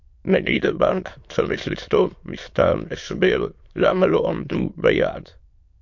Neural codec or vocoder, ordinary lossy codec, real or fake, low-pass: autoencoder, 22.05 kHz, a latent of 192 numbers a frame, VITS, trained on many speakers; MP3, 48 kbps; fake; 7.2 kHz